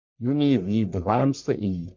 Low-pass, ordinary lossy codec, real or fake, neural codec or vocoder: 7.2 kHz; MP3, 48 kbps; fake; codec, 44.1 kHz, 1.7 kbps, Pupu-Codec